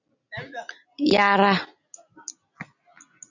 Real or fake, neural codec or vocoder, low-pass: real; none; 7.2 kHz